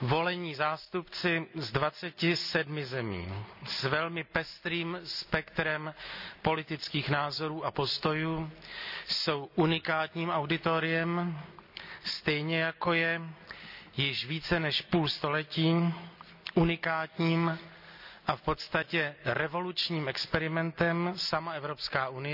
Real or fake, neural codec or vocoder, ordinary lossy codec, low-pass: real; none; none; 5.4 kHz